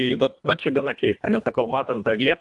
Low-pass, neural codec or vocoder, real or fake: 10.8 kHz; codec, 24 kHz, 1.5 kbps, HILCodec; fake